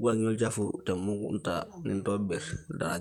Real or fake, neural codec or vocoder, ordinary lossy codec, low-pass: fake; vocoder, 44.1 kHz, 128 mel bands, Pupu-Vocoder; none; 19.8 kHz